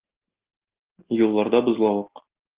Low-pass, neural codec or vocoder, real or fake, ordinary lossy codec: 3.6 kHz; none; real; Opus, 16 kbps